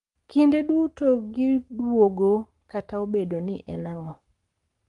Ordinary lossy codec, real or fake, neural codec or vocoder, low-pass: Opus, 32 kbps; fake; codec, 44.1 kHz, 7.8 kbps, Pupu-Codec; 10.8 kHz